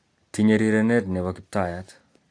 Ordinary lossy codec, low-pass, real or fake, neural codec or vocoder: AAC, 48 kbps; 9.9 kHz; real; none